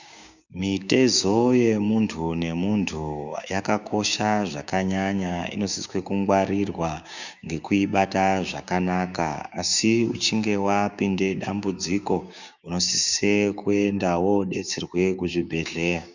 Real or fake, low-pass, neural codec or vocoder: fake; 7.2 kHz; codec, 16 kHz, 6 kbps, DAC